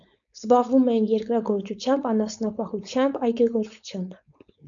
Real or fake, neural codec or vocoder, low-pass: fake; codec, 16 kHz, 4.8 kbps, FACodec; 7.2 kHz